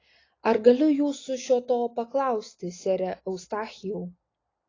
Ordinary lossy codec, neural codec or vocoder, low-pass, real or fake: AAC, 32 kbps; none; 7.2 kHz; real